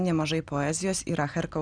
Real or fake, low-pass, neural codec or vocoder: real; 9.9 kHz; none